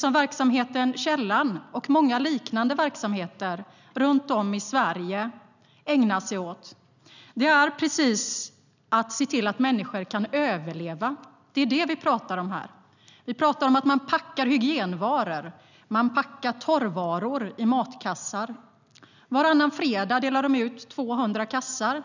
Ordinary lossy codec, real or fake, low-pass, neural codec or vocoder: none; real; 7.2 kHz; none